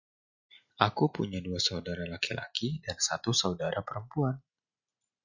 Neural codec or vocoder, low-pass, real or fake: none; 7.2 kHz; real